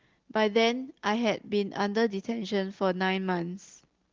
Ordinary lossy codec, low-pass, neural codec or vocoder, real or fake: Opus, 16 kbps; 7.2 kHz; none; real